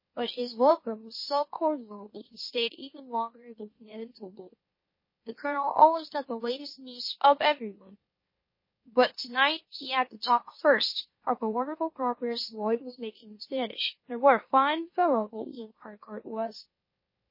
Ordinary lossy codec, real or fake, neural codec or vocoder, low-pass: MP3, 24 kbps; fake; autoencoder, 44.1 kHz, a latent of 192 numbers a frame, MeloTTS; 5.4 kHz